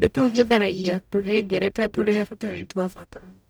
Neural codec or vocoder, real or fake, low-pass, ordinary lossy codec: codec, 44.1 kHz, 0.9 kbps, DAC; fake; none; none